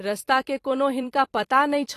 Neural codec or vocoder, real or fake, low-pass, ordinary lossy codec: vocoder, 44.1 kHz, 128 mel bands every 256 samples, BigVGAN v2; fake; 14.4 kHz; AAC, 48 kbps